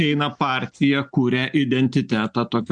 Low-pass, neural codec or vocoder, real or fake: 9.9 kHz; none; real